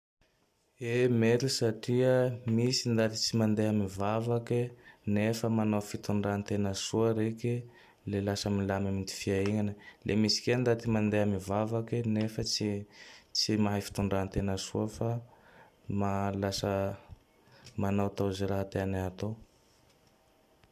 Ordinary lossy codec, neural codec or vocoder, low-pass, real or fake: none; none; 14.4 kHz; real